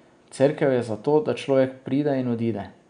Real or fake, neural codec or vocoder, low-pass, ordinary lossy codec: real; none; 9.9 kHz; none